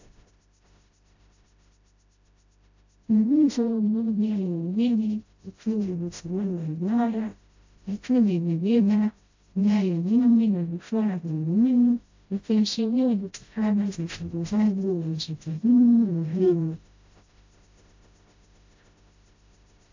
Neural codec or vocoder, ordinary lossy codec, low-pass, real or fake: codec, 16 kHz, 0.5 kbps, FreqCodec, smaller model; AAC, 48 kbps; 7.2 kHz; fake